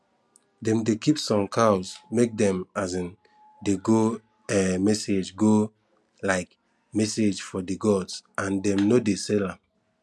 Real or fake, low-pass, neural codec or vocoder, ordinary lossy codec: real; none; none; none